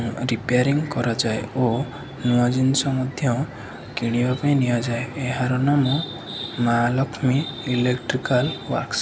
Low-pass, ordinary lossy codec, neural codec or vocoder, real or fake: none; none; none; real